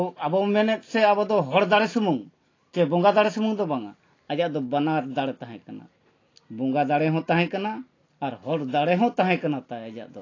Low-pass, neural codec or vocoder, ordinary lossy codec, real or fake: 7.2 kHz; none; AAC, 32 kbps; real